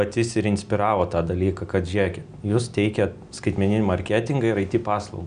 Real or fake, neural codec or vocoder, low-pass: real; none; 9.9 kHz